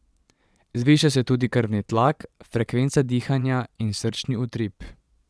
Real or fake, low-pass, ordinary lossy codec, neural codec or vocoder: fake; none; none; vocoder, 22.05 kHz, 80 mel bands, Vocos